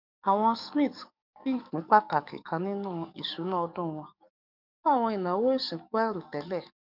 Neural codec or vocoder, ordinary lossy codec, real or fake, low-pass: codec, 44.1 kHz, 7.8 kbps, DAC; none; fake; 5.4 kHz